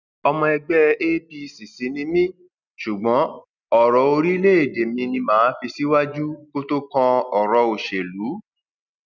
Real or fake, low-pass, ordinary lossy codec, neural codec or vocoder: real; 7.2 kHz; none; none